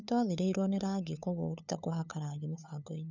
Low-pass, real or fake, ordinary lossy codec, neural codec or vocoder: 7.2 kHz; fake; none; codec, 16 kHz, 8 kbps, FunCodec, trained on LibriTTS, 25 frames a second